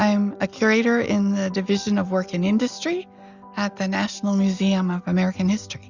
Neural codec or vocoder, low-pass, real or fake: none; 7.2 kHz; real